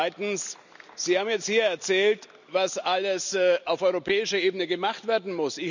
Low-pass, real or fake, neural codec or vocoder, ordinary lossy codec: 7.2 kHz; real; none; none